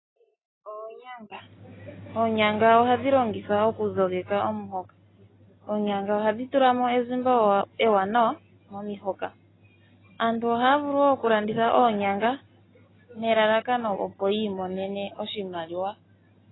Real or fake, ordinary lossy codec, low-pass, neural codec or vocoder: real; AAC, 16 kbps; 7.2 kHz; none